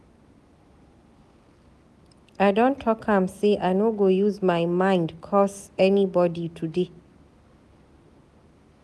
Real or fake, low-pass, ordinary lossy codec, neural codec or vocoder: real; none; none; none